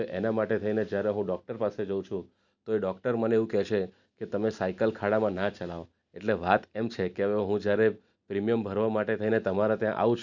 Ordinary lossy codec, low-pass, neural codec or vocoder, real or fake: none; 7.2 kHz; none; real